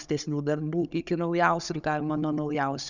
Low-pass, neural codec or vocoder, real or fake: 7.2 kHz; codec, 16 kHz, 16 kbps, FunCodec, trained on Chinese and English, 50 frames a second; fake